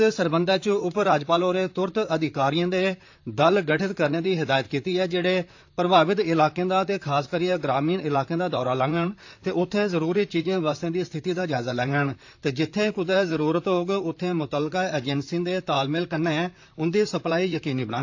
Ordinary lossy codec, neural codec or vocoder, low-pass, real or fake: AAC, 48 kbps; vocoder, 44.1 kHz, 128 mel bands, Pupu-Vocoder; 7.2 kHz; fake